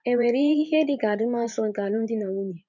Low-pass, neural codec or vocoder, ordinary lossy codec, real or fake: 7.2 kHz; vocoder, 44.1 kHz, 128 mel bands every 512 samples, BigVGAN v2; none; fake